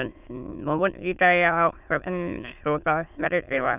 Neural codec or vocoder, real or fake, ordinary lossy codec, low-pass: autoencoder, 22.05 kHz, a latent of 192 numbers a frame, VITS, trained on many speakers; fake; none; 3.6 kHz